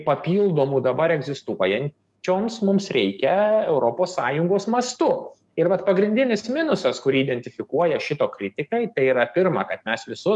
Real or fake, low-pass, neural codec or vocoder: fake; 10.8 kHz; codec, 44.1 kHz, 7.8 kbps, DAC